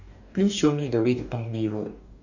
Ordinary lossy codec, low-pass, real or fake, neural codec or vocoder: none; 7.2 kHz; fake; codec, 44.1 kHz, 2.6 kbps, DAC